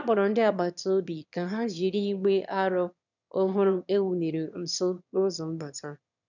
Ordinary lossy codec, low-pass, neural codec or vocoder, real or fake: none; 7.2 kHz; autoencoder, 22.05 kHz, a latent of 192 numbers a frame, VITS, trained on one speaker; fake